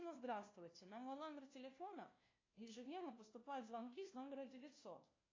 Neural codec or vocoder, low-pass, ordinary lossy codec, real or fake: codec, 16 kHz, 1 kbps, FunCodec, trained on LibriTTS, 50 frames a second; 7.2 kHz; AAC, 32 kbps; fake